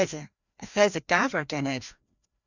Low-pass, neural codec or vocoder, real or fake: 7.2 kHz; codec, 16 kHz, 1 kbps, FreqCodec, larger model; fake